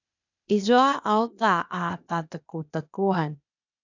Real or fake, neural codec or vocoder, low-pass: fake; codec, 16 kHz, 0.8 kbps, ZipCodec; 7.2 kHz